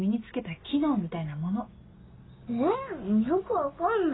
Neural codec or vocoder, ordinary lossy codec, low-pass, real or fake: none; AAC, 16 kbps; 7.2 kHz; real